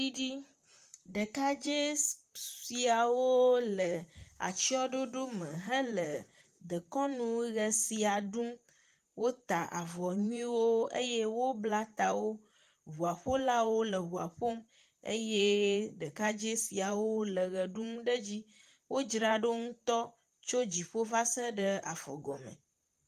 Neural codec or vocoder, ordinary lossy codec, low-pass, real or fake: vocoder, 44.1 kHz, 128 mel bands, Pupu-Vocoder; Opus, 32 kbps; 14.4 kHz; fake